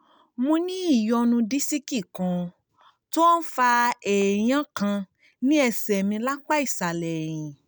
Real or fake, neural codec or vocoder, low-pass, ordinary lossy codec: real; none; none; none